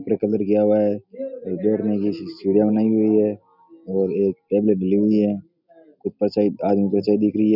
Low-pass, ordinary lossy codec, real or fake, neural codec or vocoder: 5.4 kHz; none; real; none